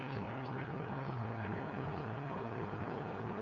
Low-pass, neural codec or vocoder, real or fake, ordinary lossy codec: 7.2 kHz; codec, 16 kHz, 2 kbps, FunCodec, trained on LibriTTS, 25 frames a second; fake; none